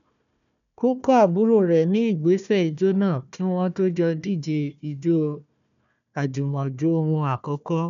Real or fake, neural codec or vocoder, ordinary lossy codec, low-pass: fake; codec, 16 kHz, 1 kbps, FunCodec, trained on Chinese and English, 50 frames a second; none; 7.2 kHz